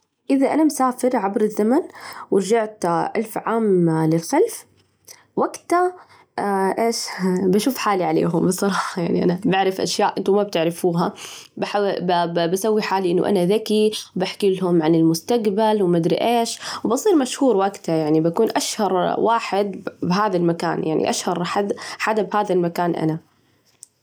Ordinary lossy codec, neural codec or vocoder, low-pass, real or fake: none; none; none; real